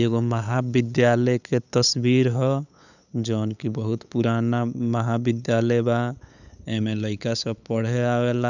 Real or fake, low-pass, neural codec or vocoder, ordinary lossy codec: fake; 7.2 kHz; codec, 16 kHz, 8 kbps, FunCodec, trained on LibriTTS, 25 frames a second; none